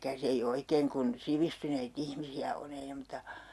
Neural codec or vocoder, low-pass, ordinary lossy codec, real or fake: none; none; none; real